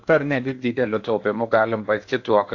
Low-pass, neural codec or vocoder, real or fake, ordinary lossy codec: 7.2 kHz; codec, 16 kHz in and 24 kHz out, 0.8 kbps, FocalCodec, streaming, 65536 codes; fake; AAC, 48 kbps